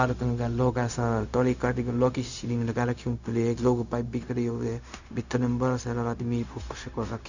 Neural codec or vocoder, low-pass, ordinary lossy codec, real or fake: codec, 16 kHz, 0.4 kbps, LongCat-Audio-Codec; 7.2 kHz; none; fake